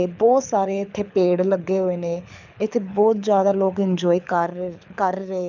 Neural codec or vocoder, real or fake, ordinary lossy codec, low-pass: codec, 24 kHz, 6 kbps, HILCodec; fake; none; 7.2 kHz